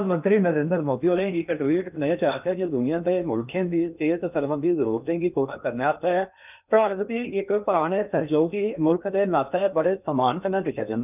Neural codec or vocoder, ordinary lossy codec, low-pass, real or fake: codec, 16 kHz in and 24 kHz out, 0.8 kbps, FocalCodec, streaming, 65536 codes; none; 3.6 kHz; fake